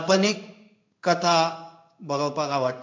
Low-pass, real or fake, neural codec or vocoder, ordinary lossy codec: 7.2 kHz; fake; codec, 16 kHz in and 24 kHz out, 1 kbps, XY-Tokenizer; MP3, 48 kbps